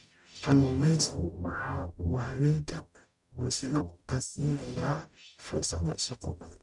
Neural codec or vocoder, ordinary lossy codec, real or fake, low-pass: codec, 44.1 kHz, 0.9 kbps, DAC; none; fake; 10.8 kHz